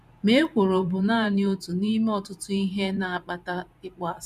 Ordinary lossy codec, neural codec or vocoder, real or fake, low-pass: none; vocoder, 44.1 kHz, 128 mel bands every 512 samples, BigVGAN v2; fake; 14.4 kHz